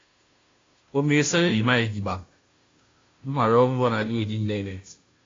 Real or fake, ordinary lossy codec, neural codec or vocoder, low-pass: fake; AAC, 32 kbps; codec, 16 kHz, 0.5 kbps, FunCodec, trained on Chinese and English, 25 frames a second; 7.2 kHz